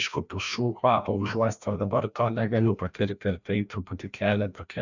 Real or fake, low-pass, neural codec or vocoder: fake; 7.2 kHz; codec, 16 kHz, 1 kbps, FreqCodec, larger model